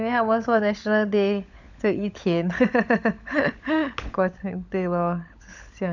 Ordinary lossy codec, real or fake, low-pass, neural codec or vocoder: none; fake; 7.2 kHz; codec, 16 kHz, 16 kbps, FunCodec, trained on LibriTTS, 50 frames a second